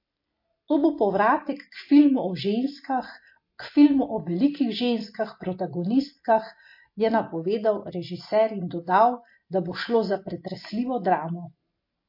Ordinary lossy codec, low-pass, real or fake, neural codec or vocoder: MP3, 32 kbps; 5.4 kHz; real; none